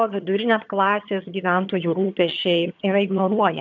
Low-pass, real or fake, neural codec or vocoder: 7.2 kHz; fake; vocoder, 22.05 kHz, 80 mel bands, HiFi-GAN